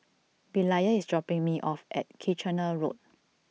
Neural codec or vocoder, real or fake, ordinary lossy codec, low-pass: none; real; none; none